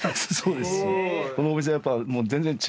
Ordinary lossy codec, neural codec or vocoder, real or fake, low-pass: none; none; real; none